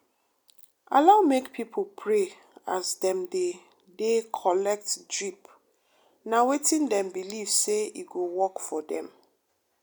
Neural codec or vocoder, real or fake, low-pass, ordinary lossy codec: none; real; none; none